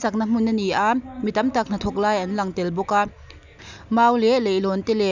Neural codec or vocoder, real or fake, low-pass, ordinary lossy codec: none; real; 7.2 kHz; none